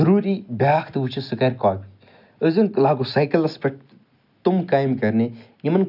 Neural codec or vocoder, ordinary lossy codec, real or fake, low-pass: none; none; real; 5.4 kHz